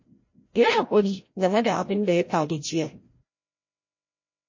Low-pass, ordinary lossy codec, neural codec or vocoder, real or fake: 7.2 kHz; MP3, 32 kbps; codec, 16 kHz, 0.5 kbps, FreqCodec, larger model; fake